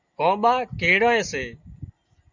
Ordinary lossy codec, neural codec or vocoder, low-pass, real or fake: MP3, 64 kbps; none; 7.2 kHz; real